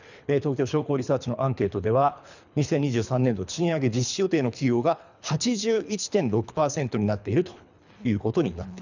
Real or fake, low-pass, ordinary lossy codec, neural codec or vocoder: fake; 7.2 kHz; none; codec, 24 kHz, 3 kbps, HILCodec